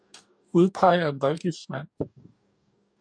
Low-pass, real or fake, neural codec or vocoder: 9.9 kHz; fake; codec, 44.1 kHz, 2.6 kbps, DAC